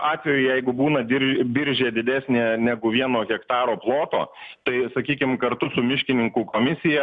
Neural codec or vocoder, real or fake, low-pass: none; real; 9.9 kHz